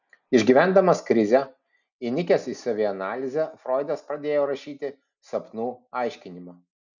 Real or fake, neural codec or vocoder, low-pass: real; none; 7.2 kHz